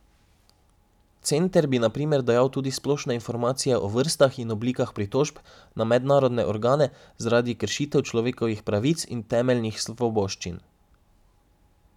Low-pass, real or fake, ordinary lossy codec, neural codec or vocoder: 19.8 kHz; real; none; none